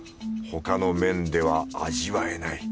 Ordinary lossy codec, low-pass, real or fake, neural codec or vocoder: none; none; real; none